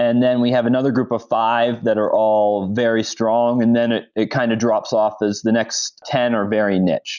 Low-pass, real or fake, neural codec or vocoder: 7.2 kHz; real; none